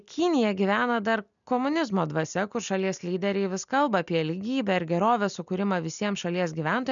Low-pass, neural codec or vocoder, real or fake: 7.2 kHz; none; real